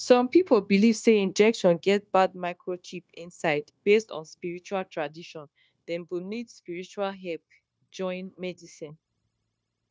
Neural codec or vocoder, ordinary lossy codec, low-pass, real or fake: codec, 16 kHz, 0.9 kbps, LongCat-Audio-Codec; none; none; fake